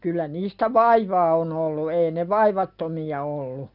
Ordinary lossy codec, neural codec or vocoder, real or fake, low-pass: none; none; real; 5.4 kHz